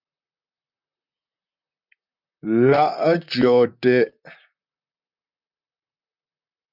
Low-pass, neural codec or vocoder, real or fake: 5.4 kHz; vocoder, 44.1 kHz, 128 mel bands, Pupu-Vocoder; fake